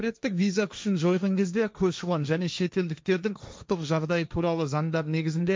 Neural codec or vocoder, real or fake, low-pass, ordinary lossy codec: codec, 16 kHz, 1.1 kbps, Voila-Tokenizer; fake; none; none